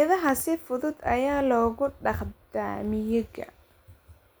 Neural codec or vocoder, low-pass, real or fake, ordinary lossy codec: none; none; real; none